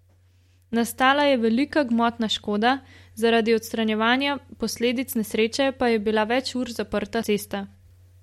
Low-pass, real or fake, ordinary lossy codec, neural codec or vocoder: 19.8 kHz; real; MP3, 64 kbps; none